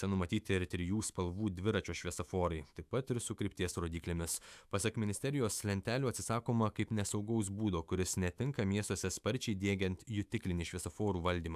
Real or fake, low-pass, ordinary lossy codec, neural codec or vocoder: fake; 14.4 kHz; AAC, 96 kbps; autoencoder, 48 kHz, 128 numbers a frame, DAC-VAE, trained on Japanese speech